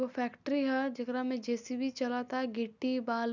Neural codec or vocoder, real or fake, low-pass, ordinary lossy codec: none; real; 7.2 kHz; none